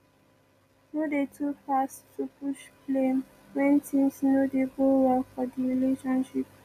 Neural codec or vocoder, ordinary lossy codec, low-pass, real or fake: none; none; 14.4 kHz; real